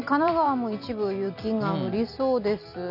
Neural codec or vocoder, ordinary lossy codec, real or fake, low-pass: vocoder, 44.1 kHz, 128 mel bands every 256 samples, BigVGAN v2; none; fake; 5.4 kHz